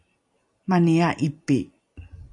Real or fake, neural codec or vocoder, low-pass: real; none; 10.8 kHz